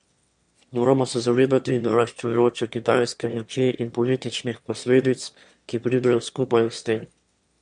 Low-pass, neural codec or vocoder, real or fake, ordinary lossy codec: 9.9 kHz; autoencoder, 22.05 kHz, a latent of 192 numbers a frame, VITS, trained on one speaker; fake; MP3, 64 kbps